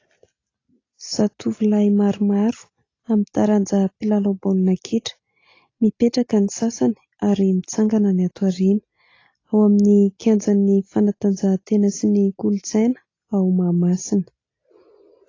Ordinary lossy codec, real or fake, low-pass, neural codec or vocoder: AAC, 32 kbps; real; 7.2 kHz; none